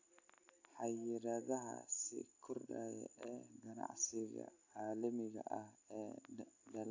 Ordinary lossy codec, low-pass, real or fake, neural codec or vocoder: AAC, 48 kbps; 7.2 kHz; real; none